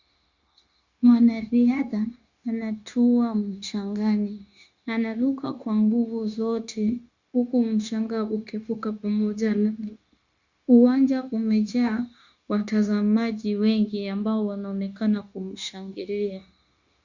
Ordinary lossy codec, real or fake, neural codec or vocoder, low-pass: Opus, 64 kbps; fake; codec, 16 kHz, 0.9 kbps, LongCat-Audio-Codec; 7.2 kHz